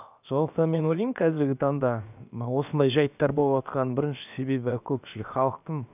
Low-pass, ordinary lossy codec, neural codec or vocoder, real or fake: 3.6 kHz; none; codec, 16 kHz, about 1 kbps, DyCAST, with the encoder's durations; fake